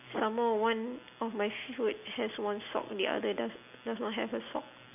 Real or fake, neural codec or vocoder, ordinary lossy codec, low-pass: real; none; none; 3.6 kHz